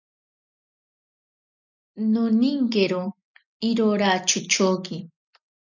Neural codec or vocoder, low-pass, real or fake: none; 7.2 kHz; real